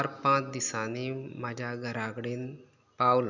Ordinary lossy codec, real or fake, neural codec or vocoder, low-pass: none; real; none; 7.2 kHz